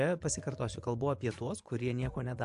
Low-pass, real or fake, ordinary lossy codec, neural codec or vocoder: 10.8 kHz; fake; AAC, 96 kbps; vocoder, 24 kHz, 100 mel bands, Vocos